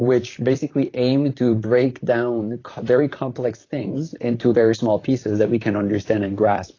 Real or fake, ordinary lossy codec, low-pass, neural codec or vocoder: fake; AAC, 32 kbps; 7.2 kHz; vocoder, 44.1 kHz, 128 mel bands, Pupu-Vocoder